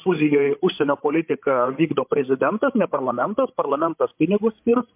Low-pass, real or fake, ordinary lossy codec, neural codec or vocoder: 3.6 kHz; fake; MP3, 32 kbps; codec, 16 kHz, 16 kbps, FreqCodec, larger model